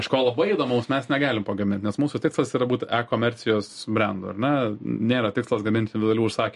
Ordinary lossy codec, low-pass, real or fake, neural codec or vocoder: MP3, 48 kbps; 14.4 kHz; real; none